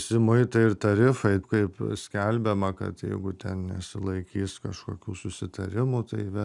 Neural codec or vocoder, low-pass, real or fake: none; 10.8 kHz; real